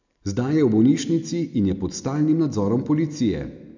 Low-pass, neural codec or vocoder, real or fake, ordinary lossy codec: 7.2 kHz; none; real; none